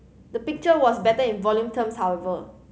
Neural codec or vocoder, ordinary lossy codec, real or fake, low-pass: none; none; real; none